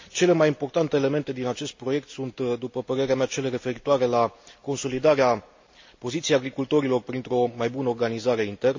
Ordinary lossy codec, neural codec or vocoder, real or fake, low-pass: MP3, 64 kbps; none; real; 7.2 kHz